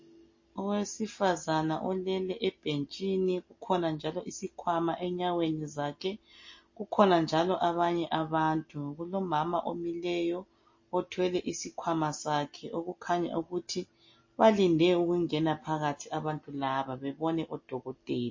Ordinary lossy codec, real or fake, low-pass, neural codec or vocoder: MP3, 32 kbps; real; 7.2 kHz; none